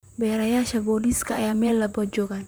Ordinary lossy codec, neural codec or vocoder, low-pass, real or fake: none; vocoder, 44.1 kHz, 128 mel bands, Pupu-Vocoder; none; fake